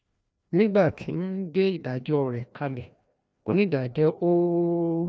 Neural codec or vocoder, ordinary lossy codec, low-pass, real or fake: codec, 16 kHz, 1 kbps, FreqCodec, larger model; none; none; fake